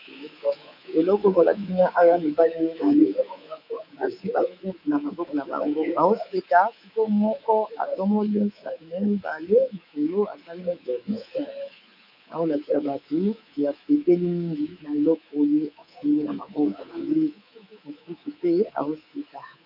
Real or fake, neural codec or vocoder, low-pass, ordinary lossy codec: fake; codec, 24 kHz, 3.1 kbps, DualCodec; 5.4 kHz; AAC, 48 kbps